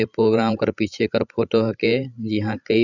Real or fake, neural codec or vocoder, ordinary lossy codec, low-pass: fake; codec, 16 kHz, 16 kbps, FreqCodec, larger model; none; 7.2 kHz